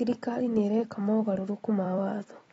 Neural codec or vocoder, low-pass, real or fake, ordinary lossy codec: vocoder, 44.1 kHz, 128 mel bands every 256 samples, BigVGAN v2; 19.8 kHz; fake; AAC, 24 kbps